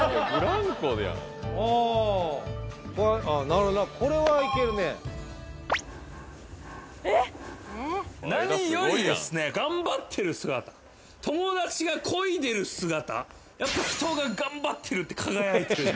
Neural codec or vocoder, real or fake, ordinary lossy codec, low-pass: none; real; none; none